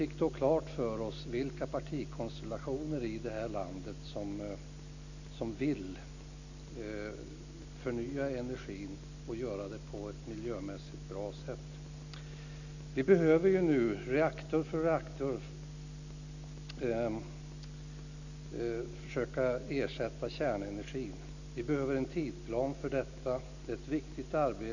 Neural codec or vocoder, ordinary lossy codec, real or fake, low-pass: none; none; real; 7.2 kHz